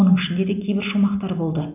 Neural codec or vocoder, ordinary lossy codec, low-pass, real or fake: none; none; 3.6 kHz; real